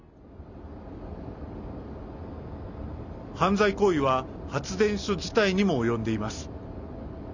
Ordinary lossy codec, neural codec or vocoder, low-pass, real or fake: none; none; 7.2 kHz; real